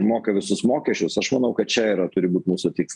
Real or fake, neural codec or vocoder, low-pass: real; none; 10.8 kHz